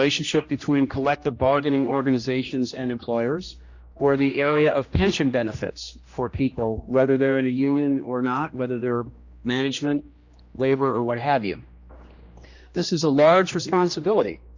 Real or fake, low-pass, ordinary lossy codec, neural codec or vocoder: fake; 7.2 kHz; Opus, 64 kbps; codec, 16 kHz, 1 kbps, X-Codec, HuBERT features, trained on general audio